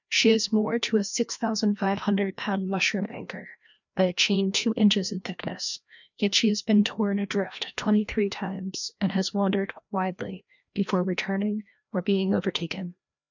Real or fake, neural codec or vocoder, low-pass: fake; codec, 16 kHz, 1 kbps, FreqCodec, larger model; 7.2 kHz